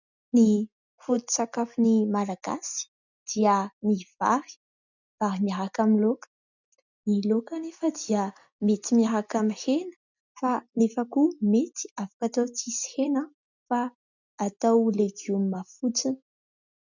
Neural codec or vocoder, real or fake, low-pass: none; real; 7.2 kHz